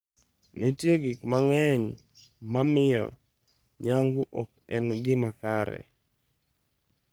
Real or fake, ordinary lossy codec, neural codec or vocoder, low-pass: fake; none; codec, 44.1 kHz, 3.4 kbps, Pupu-Codec; none